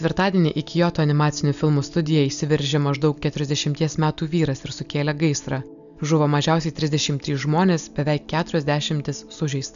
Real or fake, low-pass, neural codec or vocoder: real; 7.2 kHz; none